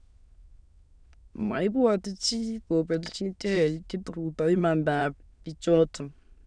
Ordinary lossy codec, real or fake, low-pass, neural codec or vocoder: none; fake; 9.9 kHz; autoencoder, 22.05 kHz, a latent of 192 numbers a frame, VITS, trained on many speakers